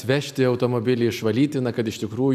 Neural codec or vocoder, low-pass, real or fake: none; 14.4 kHz; real